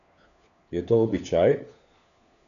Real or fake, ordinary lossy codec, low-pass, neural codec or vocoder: fake; none; 7.2 kHz; codec, 16 kHz, 4 kbps, FunCodec, trained on LibriTTS, 50 frames a second